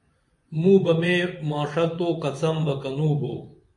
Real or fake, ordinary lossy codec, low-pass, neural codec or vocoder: fake; MP3, 48 kbps; 10.8 kHz; vocoder, 24 kHz, 100 mel bands, Vocos